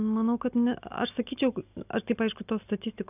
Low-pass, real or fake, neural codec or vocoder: 3.6 kHz; real; none